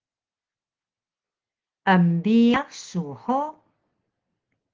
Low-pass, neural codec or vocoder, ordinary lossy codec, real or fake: 7.2 kHz; none; Opus, 16 kbps; real